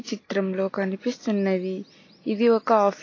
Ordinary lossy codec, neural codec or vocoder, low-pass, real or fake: AAC, 32 kbps; vocoder, 44.1 kHz, 128 mel bands every 256 samples, BigVGAN v2; 7.2 kHz; fake